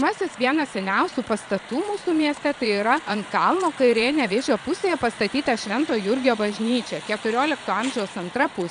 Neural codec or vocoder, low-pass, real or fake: vocoder, 22.05 kHz, 80 mel bands, Vocos; 9.9 kHz; fake